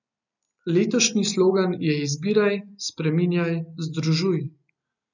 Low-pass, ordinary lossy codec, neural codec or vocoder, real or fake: 7.2 kHz; none; none; real